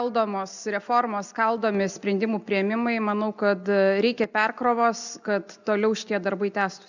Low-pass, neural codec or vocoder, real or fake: 7.2 kHz; none; real